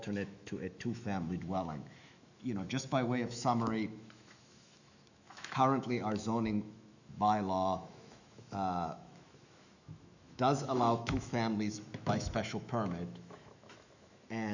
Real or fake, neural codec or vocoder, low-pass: fake; autoencoder, 48 kHz, 128 numbers a frame, DAC-VAE, trained on Japanese speech; 7.2 kHz